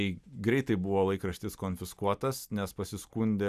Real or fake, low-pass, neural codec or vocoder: real; 14.4 kHz; none